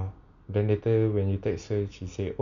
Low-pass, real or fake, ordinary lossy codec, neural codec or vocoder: 7.2 kHz; real; none; none